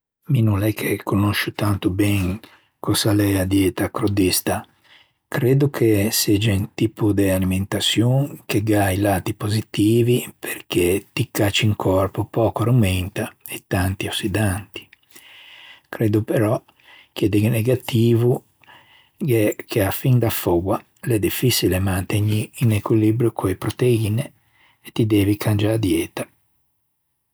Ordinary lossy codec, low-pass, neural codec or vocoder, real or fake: none; none; none; real